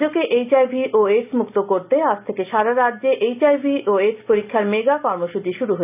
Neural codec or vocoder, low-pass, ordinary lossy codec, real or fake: none; 3.6 kHz; none; real